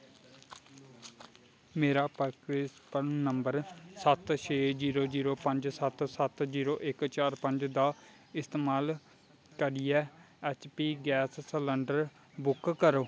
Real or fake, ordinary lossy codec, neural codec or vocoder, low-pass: real; none; none; none